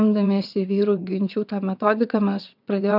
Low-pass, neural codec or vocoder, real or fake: 5.4 kHz; vocoder, 22.05 kHz, 80 mel bands, WaveNeXt; fake